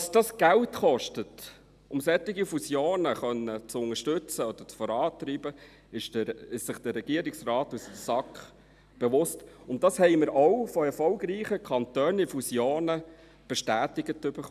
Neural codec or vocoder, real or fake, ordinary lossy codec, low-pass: none; real; none; 14.4 kHz